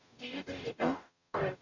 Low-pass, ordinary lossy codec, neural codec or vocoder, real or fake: 7.2 kHz; none; codec, 44.1 kHz, 0.9 kbps, DAC; fake